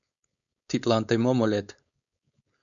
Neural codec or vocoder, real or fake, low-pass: codec, 16 kHz, 4.8 kbps, FACodec; fake; 7.2 kHz